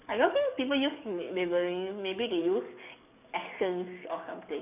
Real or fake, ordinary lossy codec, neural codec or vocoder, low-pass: fake; none; codec, 44.1 kHz, 7.8 kbps, DAC; 3.6 kHz